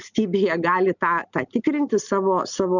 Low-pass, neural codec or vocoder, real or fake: 7.2 kHz; none; real